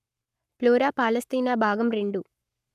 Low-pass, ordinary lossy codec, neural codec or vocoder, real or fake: 14.4 kHz; none; codec, 44.1 kHz, 7.8 kbps, Pupu-Codec; fake